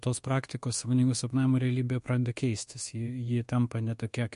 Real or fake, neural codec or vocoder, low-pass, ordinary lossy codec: fake; codec, 24 kHz, 1.2 kbps, DualCodec; 10.8 kHz; MP3, 48 kbps